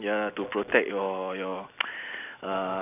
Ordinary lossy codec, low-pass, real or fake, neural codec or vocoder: none; 3.6 kHz; real; none